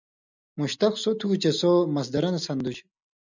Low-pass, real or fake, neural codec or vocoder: 7.2 kHz; real; none